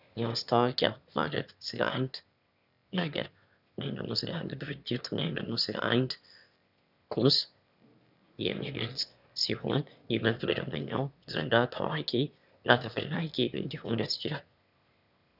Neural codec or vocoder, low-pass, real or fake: autoencoder, 22.05 kHz, a latent of 192 numbers a frame, VITS, trained on one speaker; 5.4 kHz; fake